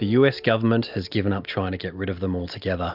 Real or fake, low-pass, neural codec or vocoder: real; 5.4 kHz; none